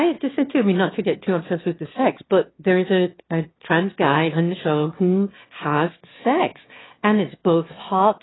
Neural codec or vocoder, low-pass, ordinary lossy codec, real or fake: autoencoder, 22.05 kHz, a latent of 192 numbers a frame, VITS, trained on one speaker; 7.2 kHz; AAC, 16 kbps; fake